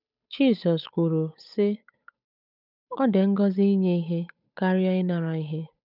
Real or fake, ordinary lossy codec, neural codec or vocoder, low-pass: fake; none; codec, 16 kHz, 8 kbps, FunCodec, trained on Chinese and English, 25 frames a second; 5.4 kHz